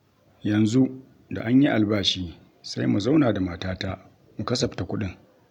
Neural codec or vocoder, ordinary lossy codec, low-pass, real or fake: none; none; 19.8 kHz; real